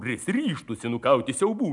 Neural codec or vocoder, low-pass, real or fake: none; 10.8 kHz; real